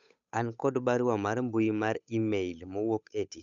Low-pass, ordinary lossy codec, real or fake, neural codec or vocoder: 7.2 kHz; none; fake; codec, 16 kHz, 8 kbps, FunCodec, trained on Chinese and English, 25 frames a second